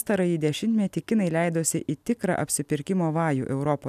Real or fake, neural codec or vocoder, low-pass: real; none; 14.4 kHz